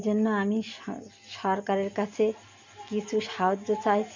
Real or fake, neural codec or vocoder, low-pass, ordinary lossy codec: real; none; 7.2 kHz; MP3, 48 kbps